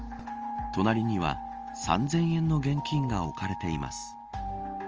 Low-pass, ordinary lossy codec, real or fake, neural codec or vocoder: 7.2 kHz; Opus, 24 kbps; real; none